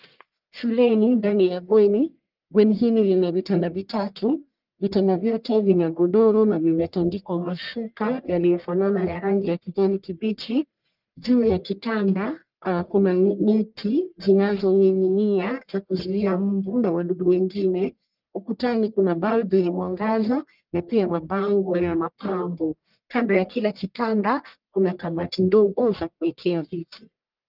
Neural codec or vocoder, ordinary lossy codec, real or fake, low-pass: codec, 44.1 kHz, 1.7 kbps, Pupu-Codec; Opus, 24 kbps; fake; 5.4 kHz